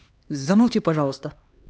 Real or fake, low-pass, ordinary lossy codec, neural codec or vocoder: fake; none; none; codec, 16 kHz, 2 kbps, X-Codec, HuBERT features, trained on LibriSpeech